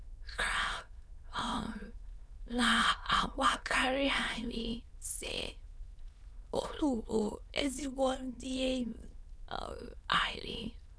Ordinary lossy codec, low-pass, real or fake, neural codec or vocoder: none; none; fake; autoencoder, 22.05 kHz, a latent of 192 numbers a frame, VITS, trained on many speakers